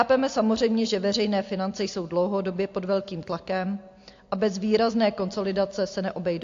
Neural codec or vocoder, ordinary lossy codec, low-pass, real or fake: none; AAC, 48 kbps; 7.2 kHz; real